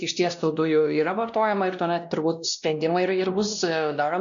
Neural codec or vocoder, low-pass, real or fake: codec, 16 kHz, 1 kbps, X-Codec, WavLM features, trained on Multilingual LibriSpeech; 7.2 kHz; fake